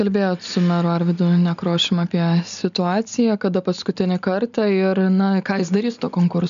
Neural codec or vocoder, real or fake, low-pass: none; real; 7.2 kHz